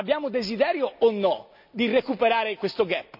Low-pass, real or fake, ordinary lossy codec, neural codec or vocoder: 5.4 kHz; real; none; none